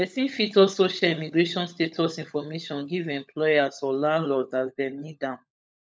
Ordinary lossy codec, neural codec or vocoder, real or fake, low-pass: none; codec, 16 kHz, 16 kbps, FunCodec, trained on LibriTTS, 50 frames a second; fake; none